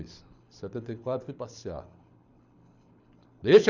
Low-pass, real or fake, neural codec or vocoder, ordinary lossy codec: 7.2 kHz; fake; codec, 24 kHz, 6 kbps, HILCodec; none